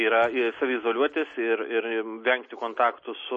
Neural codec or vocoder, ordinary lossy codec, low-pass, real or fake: none; MP3, 32 kbps; 10.8 kHz; real